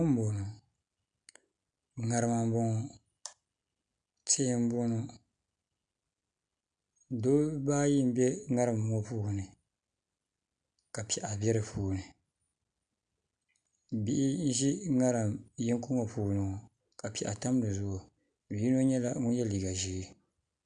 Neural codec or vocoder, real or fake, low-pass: none; real; 9.9 kHz